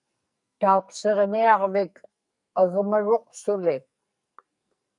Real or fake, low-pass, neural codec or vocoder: fake; 10.8 kHz; codec, 44.1 kHz, 2.6 kbps, SNAC